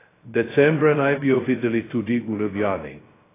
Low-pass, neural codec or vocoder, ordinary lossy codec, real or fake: 3.6 kHz; codec, 16 kHz, 0.2 kbps, FocalCodec; AAC, 16 kbps; fake